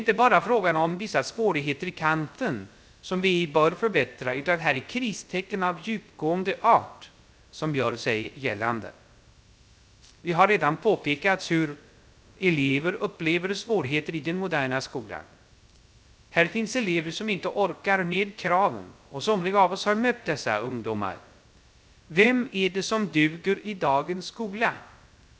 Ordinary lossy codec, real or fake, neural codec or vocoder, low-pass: none; fake; codec, 16 kHz, 0.3 kbps, FocalCodec; none